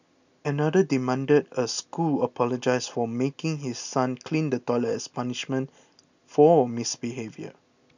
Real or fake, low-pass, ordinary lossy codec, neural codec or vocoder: real; 7.2 kHz; none; none